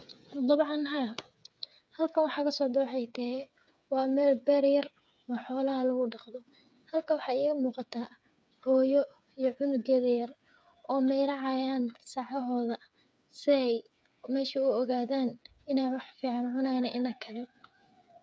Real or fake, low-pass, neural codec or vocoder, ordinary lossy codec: fake; none; codec, 16 kHz, 8 kbps, FreqCodec, smaller model; none